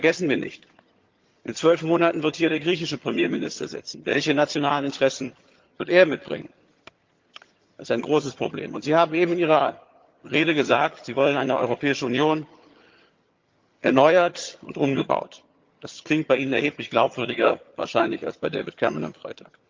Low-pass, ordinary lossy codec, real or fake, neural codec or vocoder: 7.2 kHz; Opus, 32 kbps; fake; vocoder, 22.05 kHz, 80 mel bands, HiFi-GAN